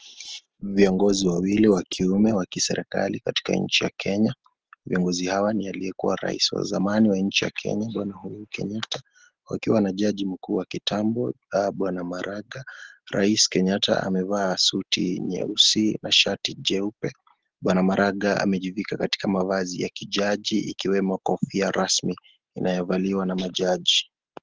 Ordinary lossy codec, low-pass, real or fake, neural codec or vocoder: Opus, 16 kbps; 7.2 kHz; real; none